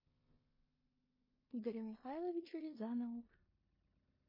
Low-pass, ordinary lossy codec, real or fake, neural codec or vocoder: 5.4 kHz; MP3, 24 kbps; fake; codec, 16 kHz in and 24 kHz out, 0.9 kbps, LongCat-Audio-Codec, four codebook decoder